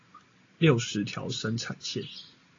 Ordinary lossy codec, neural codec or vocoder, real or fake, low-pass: AAC, 32 kbps; none; real; 7.2 kHz